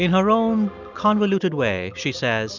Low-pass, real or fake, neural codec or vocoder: 7.2 kHz; real; none